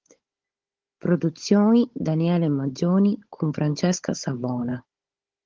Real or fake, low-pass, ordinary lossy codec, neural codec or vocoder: fake; 7.2 kHz; Opus, 16 kbps; codec, 16 kHz, 16 kbps, FunCodec, trained on Chinese and English, 50 frames a second